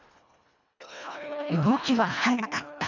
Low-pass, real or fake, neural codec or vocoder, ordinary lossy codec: 7.2 kHz; fake; codec, 24 kHz, 1.5 kbps, HILCodec; none